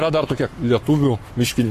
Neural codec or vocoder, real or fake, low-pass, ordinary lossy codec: codec, 44.1 kHz, 7.8 kbps, Pupu-Codec; fake; 14.4 kHz; AAC, 48 kbps